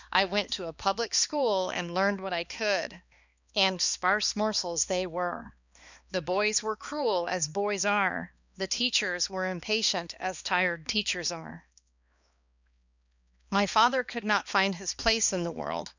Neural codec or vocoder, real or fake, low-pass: codec, 16 kHz, 2 kbps, X-Codec, HuBERT features, trained on balanced general audio; fake; 7.2 kHz